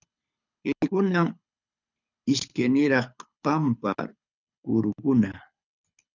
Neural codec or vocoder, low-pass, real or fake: codec, 24 kHz, 6 kbps, HILCodec; 7.2 kHz; fake